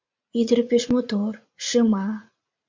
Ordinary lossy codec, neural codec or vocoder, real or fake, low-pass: MP3, 64 kbps; vocoder, 44.1 kHz, 80 mel bands, Vocos; fake; 7.2 kHz